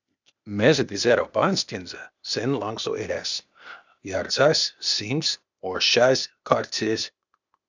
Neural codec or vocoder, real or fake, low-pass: codec, 16 kHz, 0.8 kbps, ZipCodec; fake; 7.2 kHz